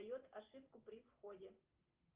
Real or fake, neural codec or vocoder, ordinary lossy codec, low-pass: real; none; Opus, 32 kbps; 3.6 kHz